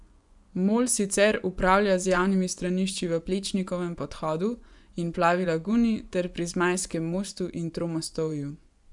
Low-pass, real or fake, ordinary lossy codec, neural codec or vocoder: 10.8 kHz; fake; none; vocoder, 24 kHz, 100 mel bands, Vocos